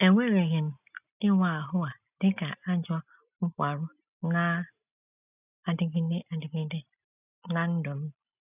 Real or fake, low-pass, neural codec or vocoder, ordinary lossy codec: real; 3.6 kHz; none; none